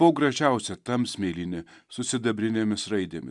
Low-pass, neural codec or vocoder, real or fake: 10.8 kHz; none; real